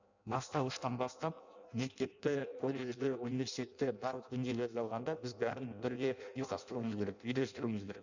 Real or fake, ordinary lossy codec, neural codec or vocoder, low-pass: fake; none; codec, 16 kHz in and 24 kHz out, 0.6 kbps, FireRedTTS-2 codec; 7.2 kHz